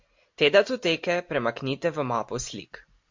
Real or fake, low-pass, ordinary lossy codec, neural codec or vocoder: real; 7.2 kHz; MP3, 48 kbps; none